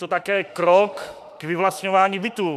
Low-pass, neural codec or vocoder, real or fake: 14.4 kHz; autoencoder, 48 kHz, 32 numbers a frame, DAC-VAE, trained on Japanese speech; fake